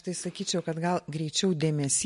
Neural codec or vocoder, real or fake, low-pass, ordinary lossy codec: none; real; 14.4 kHz; MP3, 48 kbps